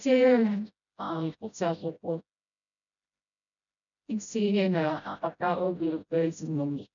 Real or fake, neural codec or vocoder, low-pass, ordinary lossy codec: fake; codec, 16 kHz, 0.5 kbps, FreqCodec, smaller model; 7.2 kHz; none